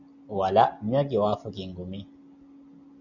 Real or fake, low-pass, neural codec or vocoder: real; 7.2 kHz; none